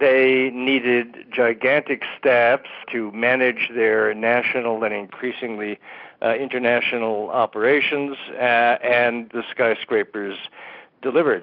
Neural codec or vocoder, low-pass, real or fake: none; 5.4 kHz; real